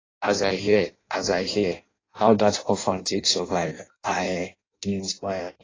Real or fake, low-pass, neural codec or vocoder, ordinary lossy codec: fake; 7.2 kHz; codec, 16 kHz in and 24 kHz out, 0.6 kbps, FireRedTTS-2 codec; AAC, 32 kbps